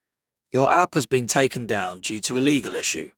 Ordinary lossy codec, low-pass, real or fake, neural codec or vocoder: none; 19.8 kHz; fake; codec, 44.1 kHz, 2.6 kbps, DAC